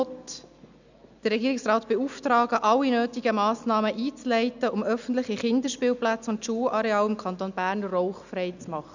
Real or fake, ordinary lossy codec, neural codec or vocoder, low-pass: real; none; none; 7.2 kHz